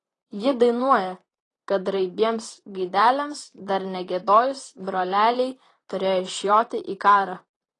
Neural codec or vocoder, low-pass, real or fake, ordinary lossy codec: vocoder, 44.1 kHz, 128 mel bands, Pupu-Vocoder; 10.8 kHz; fake; AAC, 32 kbps